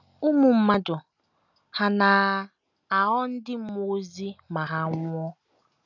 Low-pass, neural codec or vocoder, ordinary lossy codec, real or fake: 7.2 kHz; none; none; real